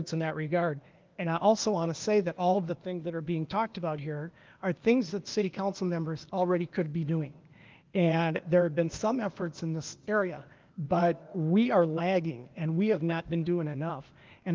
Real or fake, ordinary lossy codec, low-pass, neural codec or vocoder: fake; Opus, 32 kbps; 7.2 kHz; codec, 16 kHz, 0.8 kbps, ZipCodec